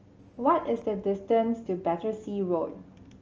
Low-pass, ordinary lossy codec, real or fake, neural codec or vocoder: 7.2 kHz; Opus, 24 kbps; real; none